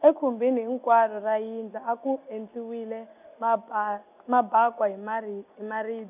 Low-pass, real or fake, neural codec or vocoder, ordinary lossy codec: 3.6 kHz; real; none; none